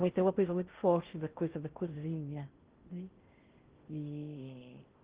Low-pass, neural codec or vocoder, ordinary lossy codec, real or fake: 3.6 kHz; codec, 16 kHz in and 24 kHz out, 0.6 kbps, FocalCodec, streaming, 4096 codes; Opus, 16 kbps; fake